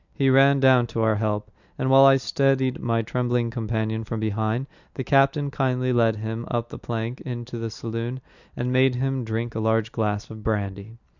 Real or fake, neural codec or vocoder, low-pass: real; none; 7.2 kHz